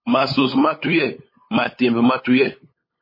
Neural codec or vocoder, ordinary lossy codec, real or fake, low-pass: codec, 16 kHz, 8 kbps, FunCodec, trained on LibriTTS, 25 frames a second; MP3, 24 kbps; fake; 5.4 kHz